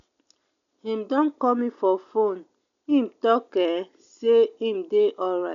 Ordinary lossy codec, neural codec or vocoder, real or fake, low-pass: none; none; real; 7.2 kHz